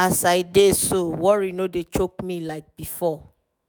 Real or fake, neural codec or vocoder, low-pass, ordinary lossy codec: fake; autoencoder, 48 kHz, 128 numbers a frame, DAC-VAE, trained on Japanese speech; none; none